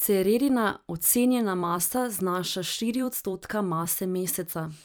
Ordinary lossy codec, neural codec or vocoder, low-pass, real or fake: none; vocoder, 44.1 kHz, 128 mel bands every 256 samples, BigVGAN v2; none; fake